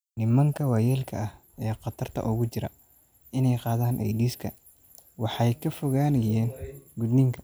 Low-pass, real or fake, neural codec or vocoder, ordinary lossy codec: none; real; none; none